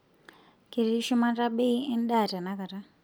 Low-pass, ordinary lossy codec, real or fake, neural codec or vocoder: none; none; real; none